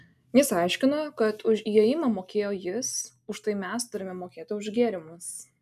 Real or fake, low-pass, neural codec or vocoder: real; 14.4 kHz; none